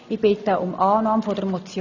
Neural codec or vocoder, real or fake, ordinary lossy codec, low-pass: none; real; none; 7.2 kHz